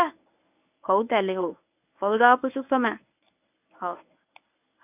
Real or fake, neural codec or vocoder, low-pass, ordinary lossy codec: fake; codec, 24 kHz, 0.9 kbps, WavTokenizer, medium speech release version 1; 3.6 kHz; none